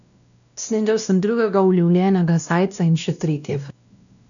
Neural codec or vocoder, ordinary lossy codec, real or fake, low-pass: codec, 16 kHz, 1 kbps, X-Codec, WavLM features, trained on Multilingual LibriSpeech; none; fake; 7.2 kHz